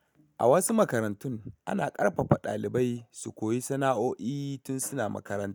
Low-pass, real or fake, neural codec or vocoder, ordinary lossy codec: none; real; none; none